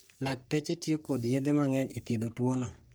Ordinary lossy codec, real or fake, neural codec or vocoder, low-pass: none; fake; codec, 44.1 kHz, 3.4 kbps, Pupu-Codec; none